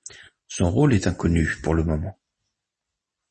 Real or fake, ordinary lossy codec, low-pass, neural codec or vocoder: real; MP3, 32 kbps; 10.8 kHz; none